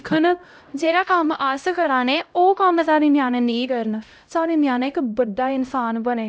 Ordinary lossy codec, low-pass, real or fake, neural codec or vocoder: none; none; fake; codec, 16 kHz, 0.5 kbps, X-Codec, HuBERT features, trained on LibriSpeech